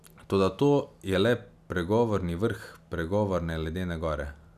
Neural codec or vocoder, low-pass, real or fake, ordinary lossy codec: none; 14.4 kHz; real; none